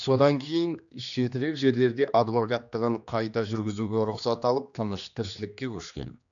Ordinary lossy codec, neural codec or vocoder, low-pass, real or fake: none; codec, 16 kHz, 2 kbps, X-Codec, HuBERT features, trained on general audio; 7.2 kHz; fake